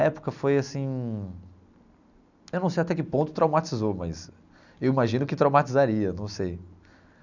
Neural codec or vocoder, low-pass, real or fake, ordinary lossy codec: none; 7.2 kHz; real; none